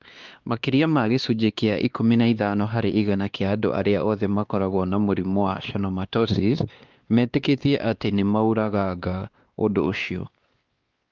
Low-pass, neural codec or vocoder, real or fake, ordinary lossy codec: 7.2 kHz; codec, 16 kHz, 2 kbps, X-Codec, WavLM features, trained on Multilingual LibriSpeech; fake; Opus, 32 kbps